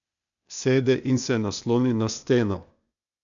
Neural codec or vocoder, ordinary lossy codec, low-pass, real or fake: codec, 16 kHz, 0.8 kbps, ZipCodec; none; 7.2 kHz; fake